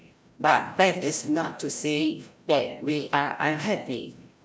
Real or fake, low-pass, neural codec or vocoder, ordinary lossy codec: fake; none; codec, 16 kHz, 0.5 kbps, FreqCodec, larger model; none